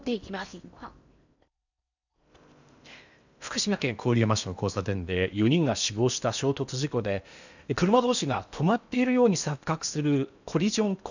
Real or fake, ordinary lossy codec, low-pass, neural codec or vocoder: fake; none; 7.2 kHz; codec, 16 kHz in and 24 kHz out, 0.8 kbps, FocalCodec, streaming, 65536 codes